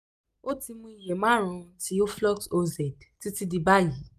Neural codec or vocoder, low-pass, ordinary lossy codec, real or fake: none; 14.4 kHz; none; real